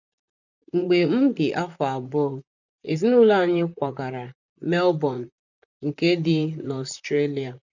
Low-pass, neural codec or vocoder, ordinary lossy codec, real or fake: 7.2 kHz; vocoder, 44.1 kHz, 128 mel bands, Pupu-Vocoder; none; fake